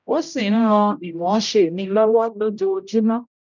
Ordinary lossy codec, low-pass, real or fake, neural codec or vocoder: none; 7.2 kHz; fake; codec, 16 kHz, 0.5 kbps, X-Codec, HuBERT features, trained on general audio